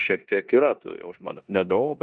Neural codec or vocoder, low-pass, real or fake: codec, 16 kHz in and 24 kHz out, 0.9 kbps, LongCat-Audio-Codec, four codebook decoder; 9.9 kHz; fake